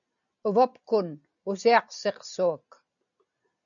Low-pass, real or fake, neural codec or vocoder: 7.2 kHz; real; none